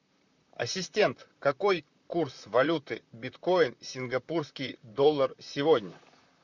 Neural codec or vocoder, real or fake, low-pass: vocoder, 44.1 kHz, 128 mel bands, Pupu-Vocoder; fake; 7.2 kHz